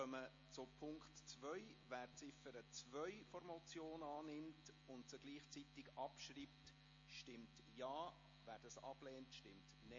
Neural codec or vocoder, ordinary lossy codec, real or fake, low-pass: none; MP3, 32 kbps; real; 7.2 kHz